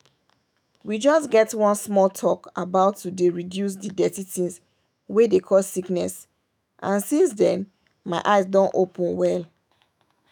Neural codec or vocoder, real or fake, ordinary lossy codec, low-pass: autoencoder, 48 kHz, 128 numbers a frame, DAC-VAE, trained on Japanese speech; fake; none; none